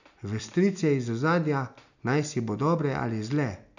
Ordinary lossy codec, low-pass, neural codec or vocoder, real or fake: none; 7.2 kHz; none; real